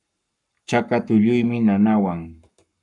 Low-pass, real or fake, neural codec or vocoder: 10.8 kHz; fake; codec, 44.1 kHz, 7.8 kbps, Pupu-Codec